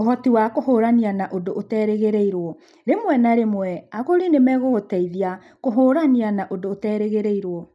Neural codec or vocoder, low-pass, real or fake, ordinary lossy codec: none; none; real; none